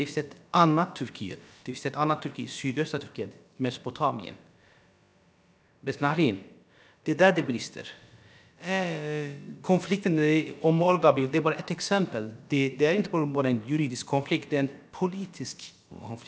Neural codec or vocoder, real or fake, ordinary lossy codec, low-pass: codec, 16 kHz, about 1 kbps, DyCAST, with the encoder's durations; fake; none; none